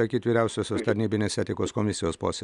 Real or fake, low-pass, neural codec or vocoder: real; 10.8 kHz; none